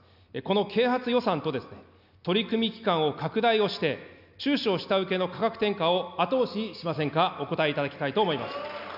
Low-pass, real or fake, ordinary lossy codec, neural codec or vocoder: 5.4 kHz; real; none; none